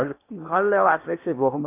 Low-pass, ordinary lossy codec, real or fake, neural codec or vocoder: 3.6 kHz; AAC, 24 kbps; fake; codec, 16 kHz in and 24 kHz out, 0.8 kbps, FocalCodec, streaming, 65536 codes